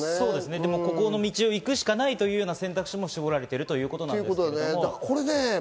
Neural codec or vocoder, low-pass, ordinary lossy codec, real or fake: none; none; none; real